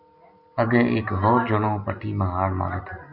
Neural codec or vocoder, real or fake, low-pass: none; real; 5.4 kHz